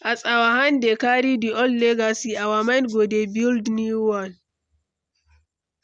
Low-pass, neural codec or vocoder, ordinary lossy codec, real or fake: 9.9 kHz; none; none; real